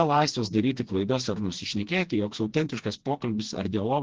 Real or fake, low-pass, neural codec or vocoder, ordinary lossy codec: fake; 7.2 kHz; codec, 16 kHz, 2 kbps, FreqCodec, smaller model; Opus, 16 kbps